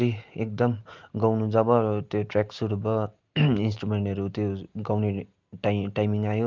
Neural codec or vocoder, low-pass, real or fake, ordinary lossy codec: none; 7.2 kHz; real; Opus, 32 kbps